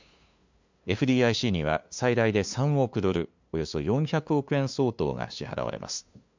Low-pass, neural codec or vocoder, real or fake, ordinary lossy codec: 7.2 kHz; codec, 16 kHz, 2 kbps, FunCodec, trained on LibriTTS, 25 frames a second; fake; MP3, 64 kbps